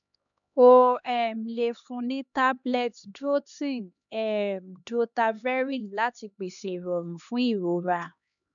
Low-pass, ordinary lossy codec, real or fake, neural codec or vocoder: 7.2 kHz; none; fake; codec, 16 kHz, 2 kbps, X-Codec, HuBERT features, trained on LibriSpeech